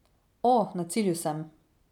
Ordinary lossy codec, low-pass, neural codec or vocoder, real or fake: none; 19.8 kHz; none; real